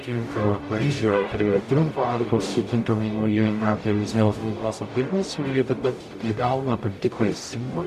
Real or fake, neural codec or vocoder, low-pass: fake; codec, 44.1 kHz, 0.9 kbps, DAC; 14.4 kHz